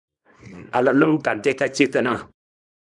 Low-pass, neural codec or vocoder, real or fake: 10.8 kHz; codec, 24 kHz, 0.9 kbps, WavTokenizer, small release; fake